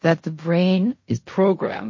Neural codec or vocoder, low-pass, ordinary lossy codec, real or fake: codec, 16 kHz in and 24 kHz out, 0.4 kbps, LongCat-Audio-Codec, fine tuned four codebook decoder; 7.2 kHz; MP3, 32 kbps; fake